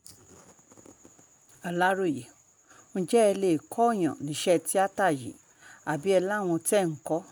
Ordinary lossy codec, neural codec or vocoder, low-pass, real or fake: none; none; none; real